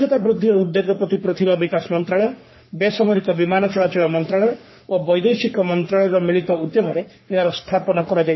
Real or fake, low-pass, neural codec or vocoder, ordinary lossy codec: fake; 7.2 kHz; codec, 44.1 kHz, 3.4 kbps, Pupu-Codec; MP3, 24 kbps